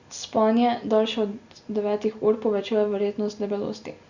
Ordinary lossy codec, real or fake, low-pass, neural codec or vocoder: Opus, 64 kbps; real; 7.2 kHz; none